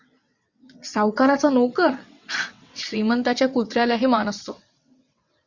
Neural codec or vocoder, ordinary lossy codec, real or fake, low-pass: vocoder, 22.05 kHz, 80 mel bands, WaveNeXt; Opus, 64 kbps; fake; 7.2 kHz